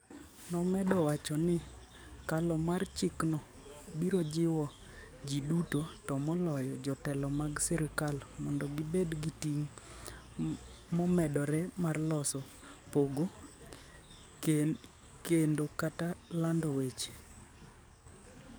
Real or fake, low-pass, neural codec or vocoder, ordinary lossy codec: fake; none; codec, 44.1 kHz, 7.8 kbps, DAC; none